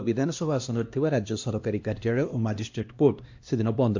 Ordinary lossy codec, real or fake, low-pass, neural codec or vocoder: MP3, 48 kbps; fake; 7.2 kHz; codec, 16 kHz, 1 kbps, X-Codec, HuBERT features, trained on LibriSpeech